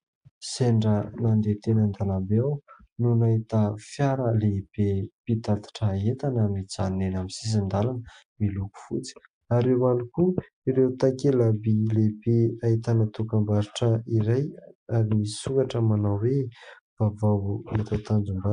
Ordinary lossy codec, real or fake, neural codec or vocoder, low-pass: AAC, 96 kbps; real; none; 9.9 kHz